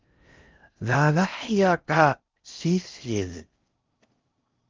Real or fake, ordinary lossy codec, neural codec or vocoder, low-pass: fake; Opus, 32 kbps; codec, 16 kHz in and 24 kHz out, 0.6 kbps, FocalCodec, streaming, 4096 codes; 7.2 kHz